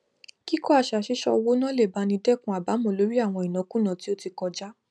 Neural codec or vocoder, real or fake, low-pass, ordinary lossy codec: none; real; none; none